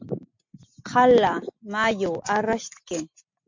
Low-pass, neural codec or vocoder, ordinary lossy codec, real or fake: 7.2 kHz; none; MP3, 48 kbps; real